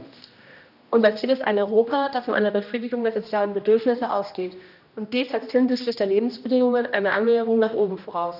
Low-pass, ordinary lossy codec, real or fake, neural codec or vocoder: 5.4 kHz; none; fake; codec, 16 kHz, 1 kbps, X-Codec, HuBERT features, trained on general audio